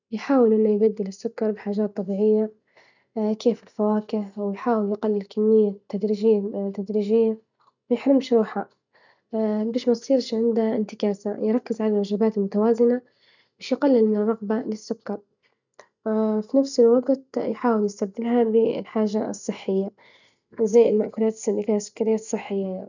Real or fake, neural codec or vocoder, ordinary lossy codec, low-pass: real; none; none; 7.2 kHz